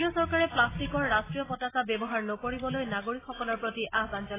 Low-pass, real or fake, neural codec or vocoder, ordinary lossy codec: 3.6 kHz; real; none; AAC, 16 kbps